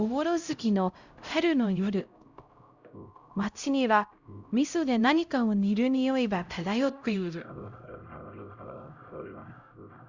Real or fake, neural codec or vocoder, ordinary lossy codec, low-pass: fake; codec, 16 kHz, 0.5 kbps, X-Codec, HuBERT features, trained on LibriSpeech; Opus, 64 kbps; 7.2 kHz